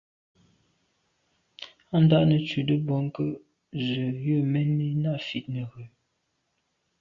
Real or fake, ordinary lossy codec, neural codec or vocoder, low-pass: real; Opus, 64 kbps; none; 7.2 kHz